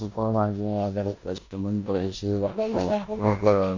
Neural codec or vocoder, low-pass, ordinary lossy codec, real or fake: codec, 16 kHz in and 24 kHz out, 0.9 kbps, LongCat-Audio-Codec, four codebook decoder; 7.2 kHz; MP3, 64 kbps; fake